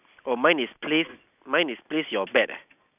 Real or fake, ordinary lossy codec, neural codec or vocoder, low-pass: real; none; none; 3.6 kHz